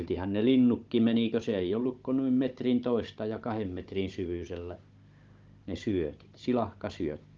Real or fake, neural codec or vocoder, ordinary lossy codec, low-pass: fake; codec, 16 kHz, 16 kbps, FunCodec, trained on Chinese and English, 50 frames a second; Opus, 32 kbps; 7.2 kHz